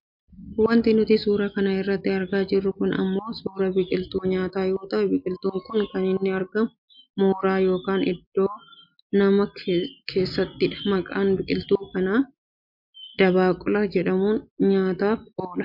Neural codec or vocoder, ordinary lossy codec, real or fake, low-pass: none; MP3, 48 kbps; real; 5.4 kHz